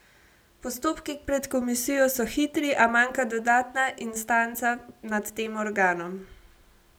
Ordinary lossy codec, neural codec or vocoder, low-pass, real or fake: none; none; none; real